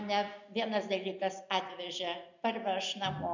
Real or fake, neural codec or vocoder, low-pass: real; none; 7.2 kHz